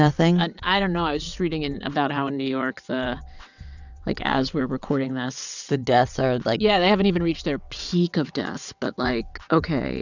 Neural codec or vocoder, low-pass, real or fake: vocoder, 22.05 kHz, 80 mel bands, WaveNeXt; 7.2 kHz; fake